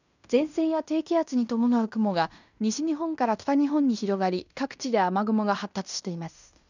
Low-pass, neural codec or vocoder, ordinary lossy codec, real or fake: 7.2 kHz; codec, 16 kHz in and 24 kHz out, 0.9 kbps, LongCat-Audio-Codec, fine tuned four codebook decoder; none; fake